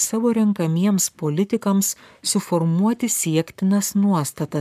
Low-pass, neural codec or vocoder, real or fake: 14.4 kHz; codec, 44.1 kHz, 7.8 kbps, Pupu-Codec; fake